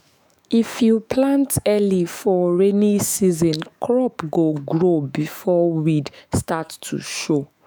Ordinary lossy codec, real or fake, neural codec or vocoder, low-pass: none; fake; autoencoder, 48 kHz, 128 numbers a frame, DAC-VAE, trained on Japanese speech; none